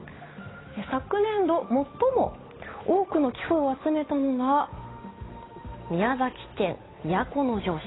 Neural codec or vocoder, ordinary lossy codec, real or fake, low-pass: codec, 16 kHz, 8 kbps, FunCodec, trained on Chinese and English, 25 frames a second; AAC, 16 kbps; fake; 7.2 kHz